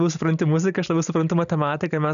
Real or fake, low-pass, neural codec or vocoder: real; 7.2 kHz; none